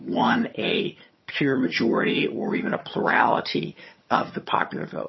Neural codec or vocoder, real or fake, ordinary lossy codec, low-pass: vocoder, 22.05 kHz, 80 mel bands, HiFi-GAN; fake; MP3, 24 kbps; 7.2 kHz